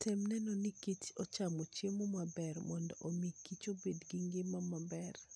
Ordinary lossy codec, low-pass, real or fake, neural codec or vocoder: none; none; real; none